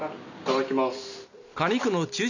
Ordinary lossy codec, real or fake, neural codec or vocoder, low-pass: none; real; none; 7.2 kHz